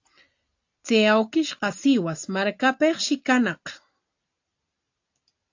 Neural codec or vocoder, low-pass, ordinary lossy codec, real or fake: none; 7.2 kHz; AAC, 48 kbps; real